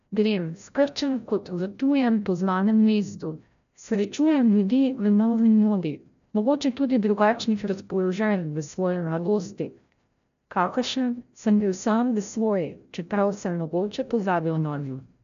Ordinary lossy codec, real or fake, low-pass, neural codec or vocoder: MP3, 96 kbps; fake; 7.2 kHz; codec, 16 kHz, 0.5 kbps, FreqCodec, larger model